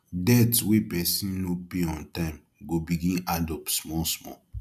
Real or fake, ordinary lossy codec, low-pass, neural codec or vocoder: real; none; 14.4 kHz; none